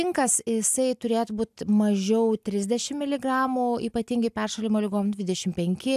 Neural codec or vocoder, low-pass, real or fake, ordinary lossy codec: none; 14.4 kHz; real; AAC, 96 kbps